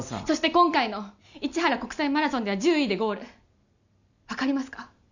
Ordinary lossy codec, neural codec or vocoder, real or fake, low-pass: none; none; real; 7.2 kHz